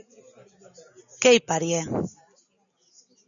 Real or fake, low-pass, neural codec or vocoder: real; 7.2 kHz; none